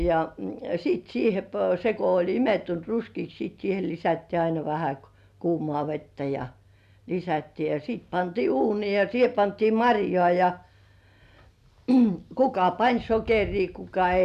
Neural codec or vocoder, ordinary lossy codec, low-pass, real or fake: none; none; 14.4 kHz; real